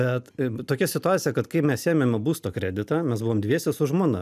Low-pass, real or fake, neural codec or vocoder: 14.4 kHz; real; none